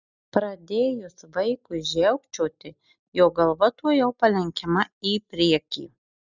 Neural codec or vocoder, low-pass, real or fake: none; 7.2 kHz; real